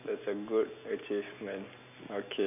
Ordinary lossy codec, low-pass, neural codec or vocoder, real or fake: none; 3.6 kHz; none; real